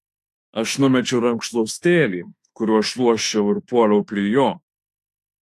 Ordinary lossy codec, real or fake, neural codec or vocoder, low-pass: AAC, 64 kbps; fake; autoencoder, 48 kHz, 32 numbers a frame, DAC-VAE, trained on Japanese speech; 14.4 kHz